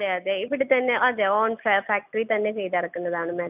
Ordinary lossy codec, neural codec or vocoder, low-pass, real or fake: none; none; 3.6 kHz; real